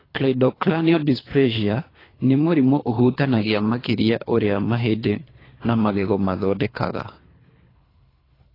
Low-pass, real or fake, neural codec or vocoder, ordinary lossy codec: 5.4 kHz; fake; codec, 24 kHz, 3 kbps, HILCodec; AAC, 24 kbps